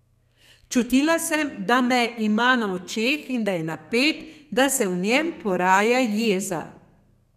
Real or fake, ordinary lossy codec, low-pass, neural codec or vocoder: fake; none; 14.4 kHz; codec, 32 kHz, 1.9 kbps, SNAC